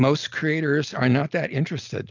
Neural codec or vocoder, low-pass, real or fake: none; 7.2 kHz; real